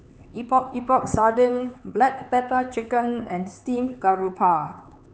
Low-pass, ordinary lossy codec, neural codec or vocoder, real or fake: none; none; codec, 16 kHz, 4 kbps, X-Codec, HuBERT features, trained on LibriSpeech; fake